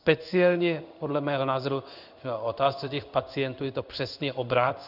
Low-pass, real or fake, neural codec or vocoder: 5.4 kHz; fake; codec, 16 kHz in and 24 kHz out, 1 kbps, XY-Tokenizer